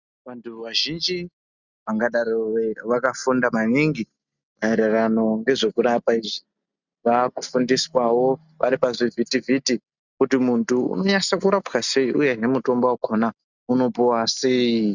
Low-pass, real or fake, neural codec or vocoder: 7.2 kHz; real; none